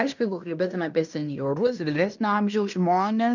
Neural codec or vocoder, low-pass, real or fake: codec, 16 kHz in and 24 kHz out, 0.9 kbps, LongCat-Audio-Codec, fine tuned four codebook decoder; 7.2 kHz; fake